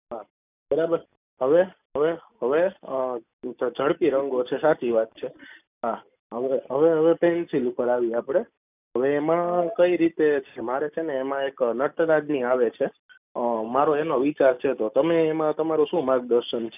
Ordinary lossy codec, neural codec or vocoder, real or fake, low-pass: none; none; real; 3.6 kHz